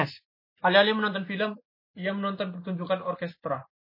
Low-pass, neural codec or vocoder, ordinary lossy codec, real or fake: 5.4 kHz; none; MP3, 24 kbps; real